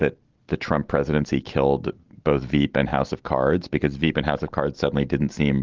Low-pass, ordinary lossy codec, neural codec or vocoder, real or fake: 7.2 kHz; Opus, 16 kbps; none; real